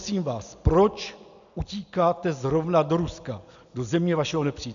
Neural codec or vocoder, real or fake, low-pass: none; real; 7.2 kHz